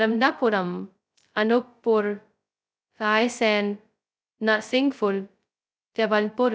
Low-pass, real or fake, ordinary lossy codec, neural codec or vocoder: none; fake; none; codec, 16 kHz, 0.2 kbps, FocalCodec